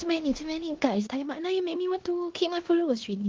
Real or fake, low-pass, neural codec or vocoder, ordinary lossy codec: fake; 7.2 kHz; codec, 16 kHz in and 24 kHz out, 0.9 kbps, LongCat-Audio-Codec, four codebook decoder; Opus, 16 kbps